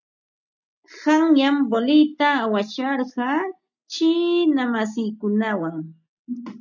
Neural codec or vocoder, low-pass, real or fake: none; 7.2 kHz; real